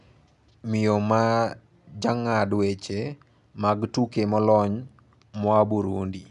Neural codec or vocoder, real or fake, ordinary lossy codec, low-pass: none; real; none; 10.8 kHz